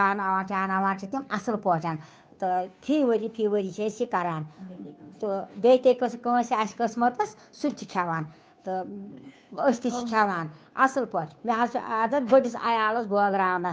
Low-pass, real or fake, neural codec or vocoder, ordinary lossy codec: none; fake; codec, 16 kHz, 2 kbps, FunCodec, trained on Chinese and English, 25 frames a second; none